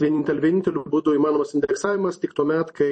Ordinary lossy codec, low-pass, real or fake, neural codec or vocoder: MP3, 32 kbps; 10.8 kHz; fake; vocoder, 44.1 kHz, 128 mel bands every 256 samples, BigVGAN v2